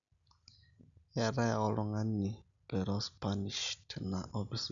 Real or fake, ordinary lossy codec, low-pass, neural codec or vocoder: real; none; 7.2 kHz; none